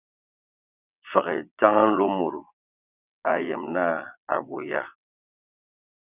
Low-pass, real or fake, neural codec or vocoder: 3.6 kHz; fake; vocoder, 22.05 kHz, 80 mel bands, WaveNeXt